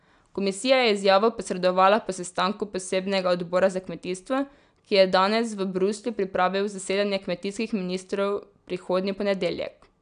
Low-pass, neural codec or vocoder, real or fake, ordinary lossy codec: 9.9 kHz; none; real; none